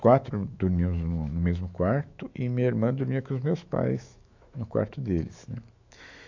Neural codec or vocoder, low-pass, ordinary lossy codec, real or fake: autoencoder, 48 kHz, 128 numbers a frame, DAC-VAE, trained on Japanese speech; 7.2 kHz; AAC, 48 kbps; fake